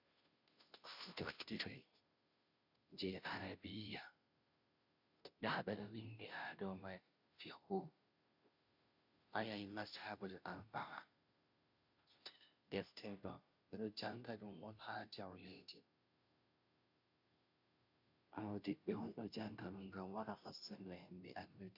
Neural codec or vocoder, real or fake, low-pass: codec, 16 kHz, 0.5 kbps, FunCodec, trained on Chinese and English, 25 frames a second; fake; 5.4 kHz